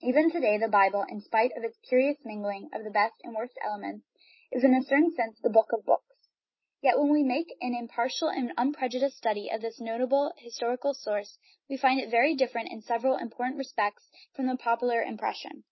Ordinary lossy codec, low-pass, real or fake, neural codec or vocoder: MP3, 24 kbps; 7.2 kHz; real; none